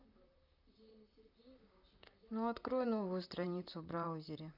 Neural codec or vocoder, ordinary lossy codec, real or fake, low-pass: vocoder, 44.1 kHz, 128 mel bands, Pupu-Vocoder; none; fake; 5.4 kHz